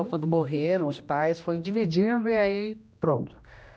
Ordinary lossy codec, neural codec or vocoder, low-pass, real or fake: none; codec, 16 kHz, 1 kbps, X-Codec, HuBERT features, trained on general audio; none; fake